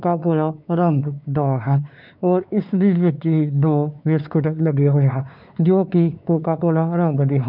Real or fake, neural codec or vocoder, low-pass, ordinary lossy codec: fake; codec, 16 kHz, 4 kbps, X-Codec, WavLM features, trained on Multilingual LibriSpeech; 5.4 kHz; none